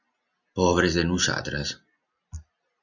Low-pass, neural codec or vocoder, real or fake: 7.2 kHz; none; real